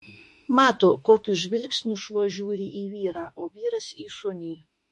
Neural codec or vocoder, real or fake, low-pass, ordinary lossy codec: autoencoder, 48 kHz, 32 numbers a frame, DAC-VAE, trained on Japanese speech; fake; 14.4 kHz; MP3, 48 kbps